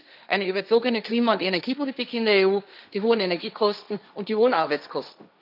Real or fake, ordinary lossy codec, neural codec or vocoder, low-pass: fake; none; codec, 16 kHz, 1.1 kbps, Voila-Tokenizer; 5.4 kHz